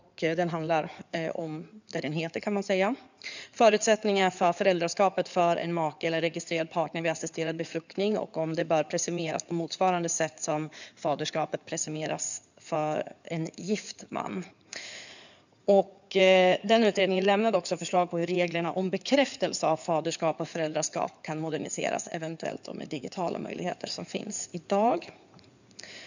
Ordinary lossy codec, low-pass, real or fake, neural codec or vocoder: none; 7.2 kHz; fake; codec, 16 kHz in and 24 kHz out, 2.2 kbps, FireRedTTS-2 codec